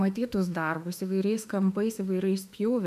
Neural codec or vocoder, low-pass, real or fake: autoencoder, 48 kHz, 32 numbers a frame, DAC-VAE, trained on Japanese speech; 14.4 kHz; fake